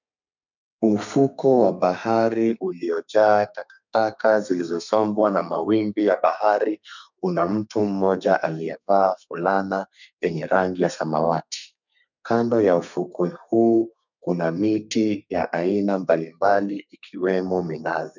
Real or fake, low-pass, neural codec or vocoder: fake; 7.2 kHz; codec, 32 kHz, 1.9 kbps, SNAC